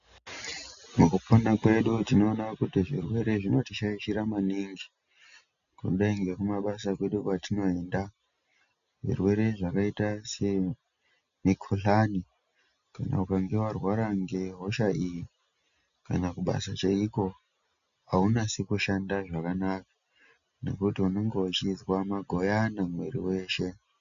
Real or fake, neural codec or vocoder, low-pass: real; none; 7.2 kHz